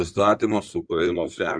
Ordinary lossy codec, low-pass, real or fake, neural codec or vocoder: Opus, 64 kbps; 9.9 kHz; fake; codec, 16 kHz in and 24 kHz out, 2.2 kbps, FireRedTTS-2 codec